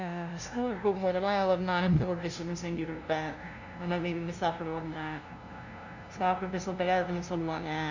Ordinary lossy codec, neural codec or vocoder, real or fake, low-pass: none; codec, 16 kHz, 0.5 kbps, FunCodec, trained on LibriTTS, 25 frames a second; fake; 7.2 kHz